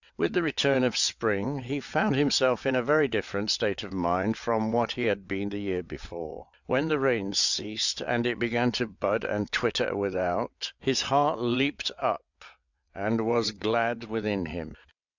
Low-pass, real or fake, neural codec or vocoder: 7.2 kHz; fake; vocoder, 22.05 kHz, 80 mel bands, WaveNeXt